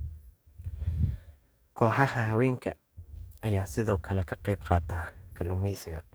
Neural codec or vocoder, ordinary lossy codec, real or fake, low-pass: codec, 44.1 kHz, 2.6 kbps, DAC; none; fake; none